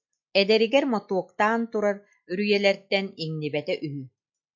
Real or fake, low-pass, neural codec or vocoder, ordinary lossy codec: real; 7.2 kHz; none; MP3, 48 kbps